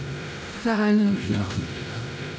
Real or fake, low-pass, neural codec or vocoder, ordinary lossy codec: fake; none; codec, 16 kHz, 0.5 kbps, X-Codec, WavLM features, trained on Multilingual LibriSpeech; none